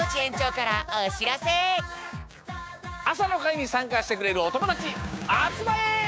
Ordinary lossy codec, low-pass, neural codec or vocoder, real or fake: none; none; codec, 16 kHz, 6 kbps, DAC; fake